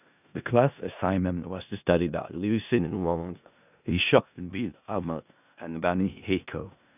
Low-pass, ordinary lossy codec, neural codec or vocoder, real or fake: 3.6 kHz; none; codec, 16 kHz in and 24 kHz out, 0.4 kbps, LongCat-Audio-Codec, four codebook decoder; fake